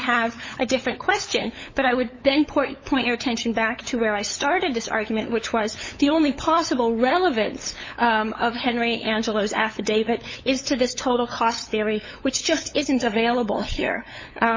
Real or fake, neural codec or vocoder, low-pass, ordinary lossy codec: fake; codec, 16 kHz, 8 kbps, FunCodec, trained on LibriTTS, 25 frames a second; 7.2 kHz; MP3, 32 kbps